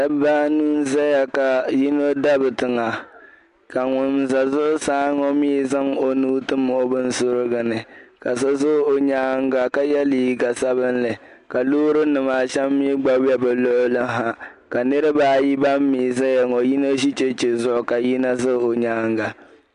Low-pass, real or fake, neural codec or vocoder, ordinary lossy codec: 10.8 kHz; real; none; MP3, 64 kbps